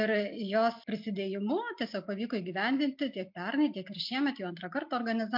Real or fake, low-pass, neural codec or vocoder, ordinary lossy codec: real; 5.4 kHz; none; MP3, 48 kbps